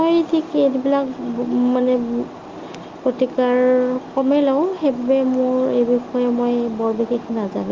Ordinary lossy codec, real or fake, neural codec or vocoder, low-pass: Opus, 32 kbps; real; none; 7.2 kHz